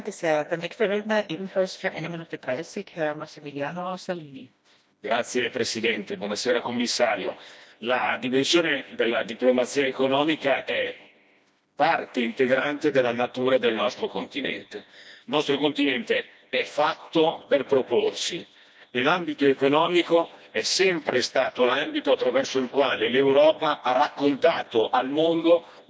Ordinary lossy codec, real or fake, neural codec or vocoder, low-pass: none; fake; codec, 16 kHz, 1 kbps, FreqCodec, smaller model; none